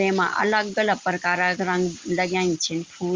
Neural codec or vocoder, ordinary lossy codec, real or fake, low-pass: none; Opus, 32 kbps; real; 7.2 kHz